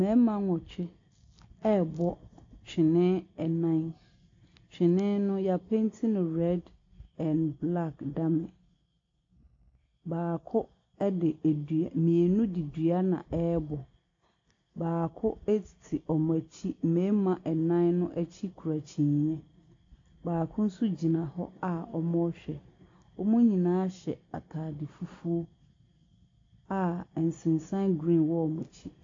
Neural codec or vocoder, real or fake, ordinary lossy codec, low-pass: none; real; AAC, 48 kbps; 7.2 kHz